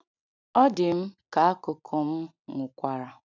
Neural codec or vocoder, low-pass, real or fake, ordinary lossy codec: none; 7.2 kHz; real; none